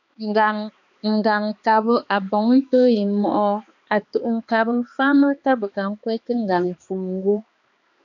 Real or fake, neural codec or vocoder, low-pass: fake; codec, 16 kHz, 2 kbps, X-Codec, HuBERT features, trained on balanced general audio; 7.2 kHz